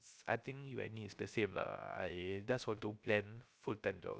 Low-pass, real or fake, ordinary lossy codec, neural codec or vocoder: none; fake; none; codec, 16 kHz, 0.3 kbps, FocalCodec